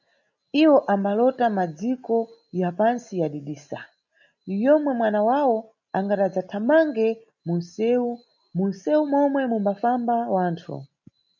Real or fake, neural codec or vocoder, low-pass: real; none; 7.2 kHz